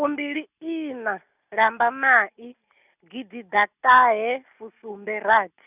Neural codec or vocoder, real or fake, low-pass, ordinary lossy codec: none; real; 3.6 kHz; none